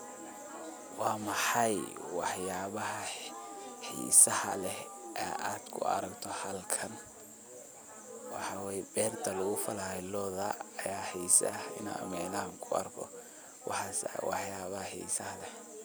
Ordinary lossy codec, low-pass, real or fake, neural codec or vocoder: none; none; real; none